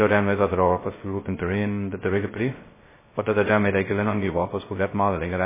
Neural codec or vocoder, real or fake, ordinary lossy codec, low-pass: codec, 16 kHz, 0.2 kbps, FocalCodec; fake; MP3, 16 kbps; 3.6 kHz